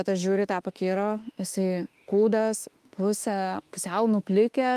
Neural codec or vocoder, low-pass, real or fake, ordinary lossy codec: autoencoder, 48 kHz, 32 numbers a frame, DAC-VAE, trained on Japanese speech; 14.4 kHz; fake; Opus, 32 kbps